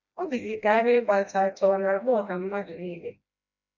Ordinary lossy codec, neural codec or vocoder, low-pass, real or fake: none; codec, 16 kHz, 1 kbps, FreqCodec, smaller model; 7.2 kHz; fake